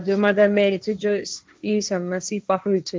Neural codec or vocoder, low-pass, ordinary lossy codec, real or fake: codec, 16 kHz, 1.1 kbps, Voila-Tokenizer; none; none; fake